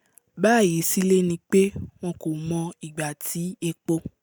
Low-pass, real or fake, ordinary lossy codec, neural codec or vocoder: none; real; none; none